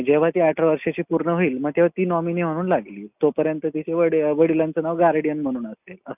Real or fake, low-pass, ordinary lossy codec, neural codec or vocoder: real; 3.6 kHz; none; none